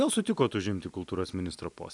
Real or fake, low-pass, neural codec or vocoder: real; 10.8 kHz; none